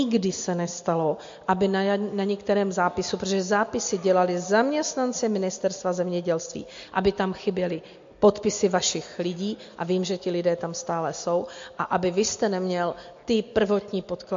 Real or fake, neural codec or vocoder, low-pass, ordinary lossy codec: real; none; 7.2 kHz; MP3, 48 kbps